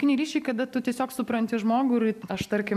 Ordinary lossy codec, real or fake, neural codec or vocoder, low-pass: AAC, 96 kbps; real; none; 14.4 kHz